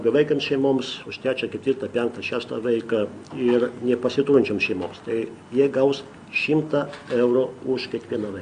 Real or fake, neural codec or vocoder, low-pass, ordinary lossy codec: real; none; 9.9 kHz; Opus, 64 kbps